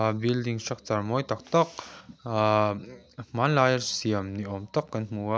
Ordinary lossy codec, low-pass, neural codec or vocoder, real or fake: none; none; none; real